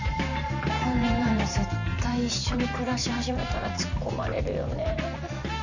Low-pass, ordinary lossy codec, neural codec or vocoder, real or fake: 7.2 kHz; none; vocoder, 44.1 kHz, 128 mel bands every 512 samples, BigVGAN v2; fake